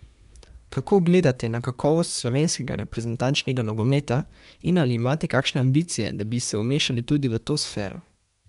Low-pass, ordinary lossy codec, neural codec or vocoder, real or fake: 10.8 kHz; none; codec, 24 kHz, 1 kbps, SNAC; fake